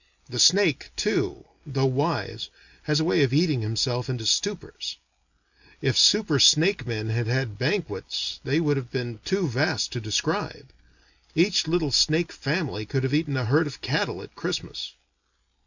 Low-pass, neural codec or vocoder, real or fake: 7.2 kHz; none; real